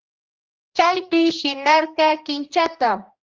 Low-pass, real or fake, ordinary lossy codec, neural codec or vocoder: 7.2 kHz; fake; Opus, 24 kbps; codec, 16 kHz, 1 kbps, X-Codec, HuBERT features, trained on general audio